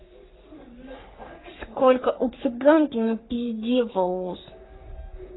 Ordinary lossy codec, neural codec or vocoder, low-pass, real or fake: AAC, 16 kbps; codec, 44.1 kHz, 3.4 kbps, Pupu-Codec; 7.2 kHz; fake